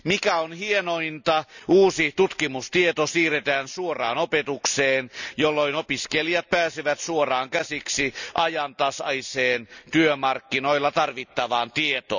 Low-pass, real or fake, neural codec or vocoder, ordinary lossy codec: 7.2 kHz; real; none; none